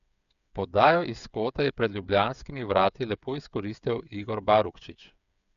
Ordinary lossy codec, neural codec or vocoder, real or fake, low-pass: none; codec, 16 kHz, 8 kbps, FreqCodec, smaller model; fake; 7.2 kHz